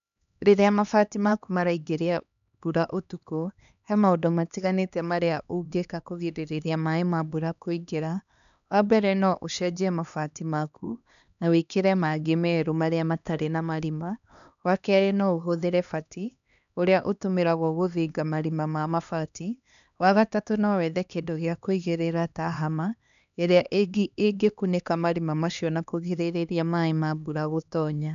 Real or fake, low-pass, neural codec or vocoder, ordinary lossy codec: fake; 7.2 kHz; codec, 16 kHz, 2 kbps, X-Codec, HuBERT features, trained on LibriSpeech; none